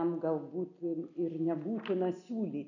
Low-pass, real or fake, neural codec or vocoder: 7.2 kHz; real; none